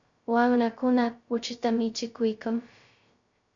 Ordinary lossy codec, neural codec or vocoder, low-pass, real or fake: MP3, 64 kbps; codec, 16 kHz, 0.2 kbps, FocalCodec; 7.2 kHz; fake